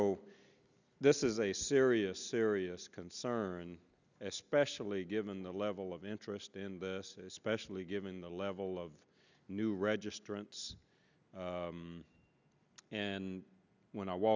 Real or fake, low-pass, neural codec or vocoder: real; 7.2 kHz; none